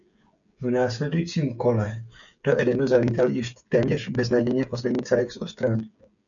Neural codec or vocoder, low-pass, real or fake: codec, 16 kHz, 8 kbps, FreqCodec, smaller model; 7.2 kHz; fake